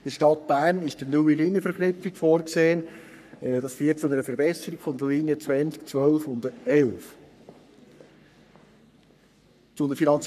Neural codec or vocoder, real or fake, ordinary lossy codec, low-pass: codec, 44.1 kHz, 3.4 kbps, Pupu-Codec; fake; none; 14.4 kHz